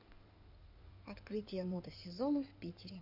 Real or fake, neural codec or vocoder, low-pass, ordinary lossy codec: fake; codec, 16 kHz in and 24 kHz out, 2.2 kbps, FireRedTTS-2 codec; 5.4 kHz; none